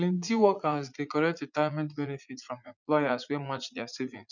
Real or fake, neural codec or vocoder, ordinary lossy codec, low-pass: fake; vocoder, 44.1 kHz, 80 mel bands, Vocos; none; 7.2 kHz